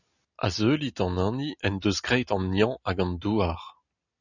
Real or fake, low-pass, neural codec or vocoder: real; 7.2 kHz; none